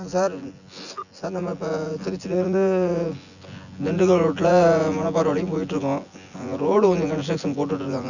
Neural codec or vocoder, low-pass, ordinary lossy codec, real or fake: vocoder, 24 kHz, 100 mel bands, Vocos; 7.2 kHz; none; fake